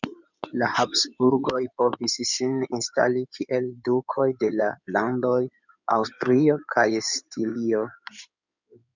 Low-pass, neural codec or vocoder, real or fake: 7.2 kHz; codec, 16 kHz in and 24 kHz out, 2.2 kbps, FireRedTTS-2 codec; fake